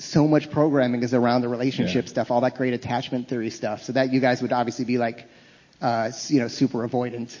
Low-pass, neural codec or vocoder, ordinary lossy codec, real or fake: 7.2 kHz; none; MP3, 32 kbps; real